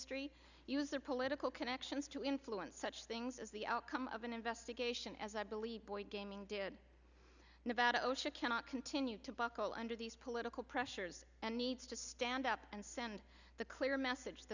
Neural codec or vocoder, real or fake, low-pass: none; real; 7.2 kHz